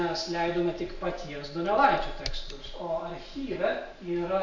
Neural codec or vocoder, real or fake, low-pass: none; real; 7.2 kHz